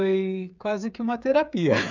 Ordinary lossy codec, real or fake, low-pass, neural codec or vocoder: none; fake; 7.2 kHz; codec, 16 kHz, 16 kbps, FreqCodec, smaller model